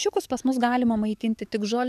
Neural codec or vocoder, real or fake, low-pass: codec, 44.1 kHz, 7.8 kbps, DAC; fake; 14.4 kHz